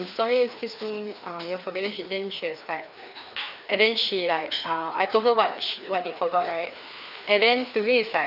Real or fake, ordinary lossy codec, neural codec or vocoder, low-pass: fake; none; codec, 16 kHz, 2 kbps, FreqCodec, larger model; 5.4 kHz